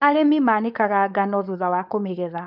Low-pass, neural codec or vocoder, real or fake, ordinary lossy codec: 5.4 kHz; codec, 16 kHz, 4.8 kbps, FACodec; fake; none